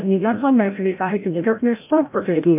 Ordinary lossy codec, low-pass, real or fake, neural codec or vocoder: MP3, 32 kbps; 3.6 kHz; fake; codec, 16 kHz, 0.5 kbps, FreqCodec, larger model